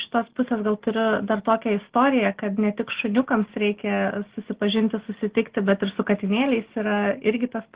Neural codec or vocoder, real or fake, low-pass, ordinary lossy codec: none; real; 3.6 kHz; Opus, 16 kbps